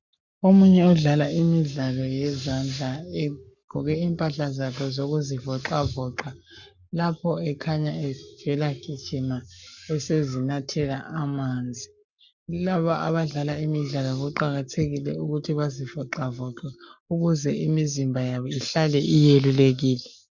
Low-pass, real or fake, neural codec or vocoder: 7.2 kHz; fake; codec, 44.1 kHz, 7.8 kbps, Pupu-Codec